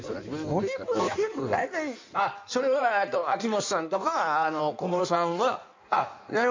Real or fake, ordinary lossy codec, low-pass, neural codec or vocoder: fake; MP3, 64 kbps; 7.2 kHz; codec, 16 kHz in and 24 kHz out, 1.1 kbps, FireRedTTS-2 codec